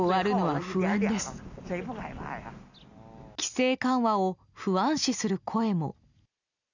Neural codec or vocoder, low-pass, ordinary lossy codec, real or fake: none; 7.2 kHz; none; real